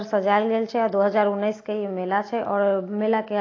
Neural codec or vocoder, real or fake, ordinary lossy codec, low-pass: none; real; AAC, 32 kbps; 7.2 kHz